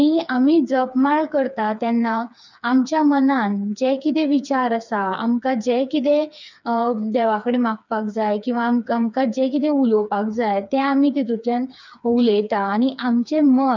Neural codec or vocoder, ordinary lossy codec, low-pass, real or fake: codec, 16 kHz, 4 kbps, FreqCodec, smaller model; none; 7.2 kHz; fake